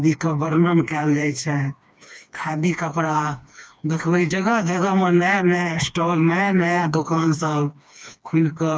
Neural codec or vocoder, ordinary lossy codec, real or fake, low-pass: codec, 16 kHz, 2 kbps, FreqCodec, smaller model; none; fake; none